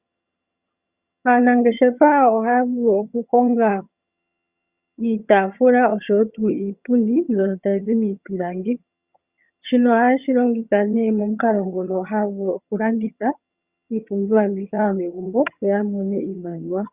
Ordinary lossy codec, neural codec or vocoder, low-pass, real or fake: Opus, 64 kbps; vocoder, 22.05 kHz, 80 mel bands, HiFi-GAN; 3.6 kHz; fake